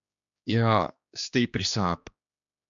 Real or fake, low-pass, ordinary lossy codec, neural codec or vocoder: fake; 7.2 kHz; MP3, 64 kbps; codec, 16 kHz, 2 kbps, X-Codec, HuBERT features, trained on general audio